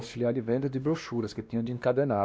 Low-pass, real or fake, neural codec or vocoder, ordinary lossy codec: none; fake; codec, 16 kHz, 1 kbps, X-Codec, WavLM features, trained on Multilingual LibriSpeech; none